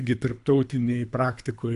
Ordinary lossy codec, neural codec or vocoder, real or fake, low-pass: AAC, 64 kbps; codec, 24 kHz, 3 kbps, HILCodec; fake; 10.8 kHz